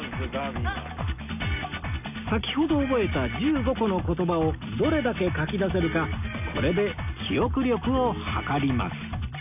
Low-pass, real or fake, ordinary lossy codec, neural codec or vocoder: 3.6 kHz; real; none; none